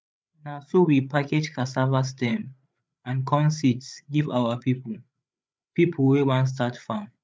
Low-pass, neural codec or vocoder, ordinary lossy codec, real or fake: none; codec, 16 kHz, 8 kbps, FreqCodec, larger model; none; fake